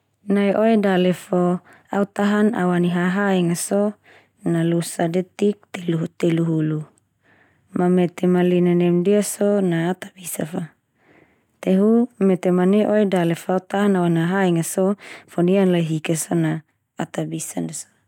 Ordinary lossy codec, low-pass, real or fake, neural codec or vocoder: none; 19.8 kHz; real; none